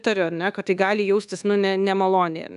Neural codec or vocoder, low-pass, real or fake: codec, 24 kHz, 1.2 kbps, DualCodec; 10.8 kHz; fake